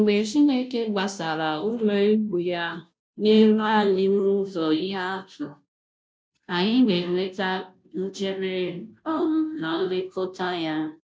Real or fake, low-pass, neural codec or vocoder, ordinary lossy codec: fake; none; codec, 16 kHz, 0.5 kbps, FunCodec, trained on Chinese and English, 25 frames a second; none